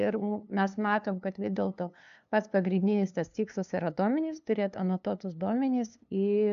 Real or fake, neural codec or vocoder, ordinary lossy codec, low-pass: fake; codec, 16 kHz, 2 kbps, FunCodec, trained on LibriTTS, 25 frames a second; MP3, 96 kbps; 7.2 kHz